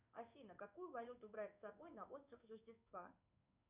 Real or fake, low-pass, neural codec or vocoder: fake; 3.6 kHz; codec, 16 kHz in and 24 kHz out, 1 kbps, XY-Tokenizer